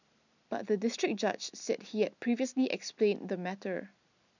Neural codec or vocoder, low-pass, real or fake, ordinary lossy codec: none; 7.2 kHz; real; none